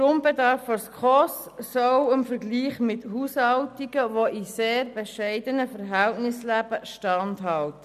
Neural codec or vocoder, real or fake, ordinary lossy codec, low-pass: none; real; none; 14.4 kHz